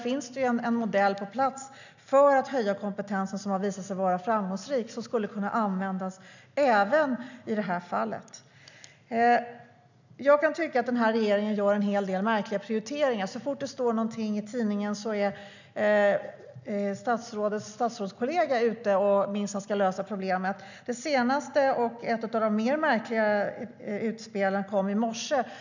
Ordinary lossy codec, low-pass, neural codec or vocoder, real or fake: none; 7.2 kHz; none; real